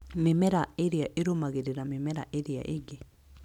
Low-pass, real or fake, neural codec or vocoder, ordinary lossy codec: 19.8 kHz; real; none; none